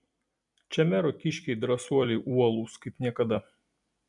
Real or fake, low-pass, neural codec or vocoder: fake; 10.8 kHz; vocoder, 44.1 kHz, 128 mel bands every 256 samples, BigVGAN v2